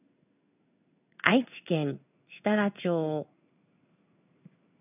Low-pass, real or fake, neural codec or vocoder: 3.6 kHz; fake; codec, 16 kHz in and 24 kHz out, 1 kbps, XY-Tokenizer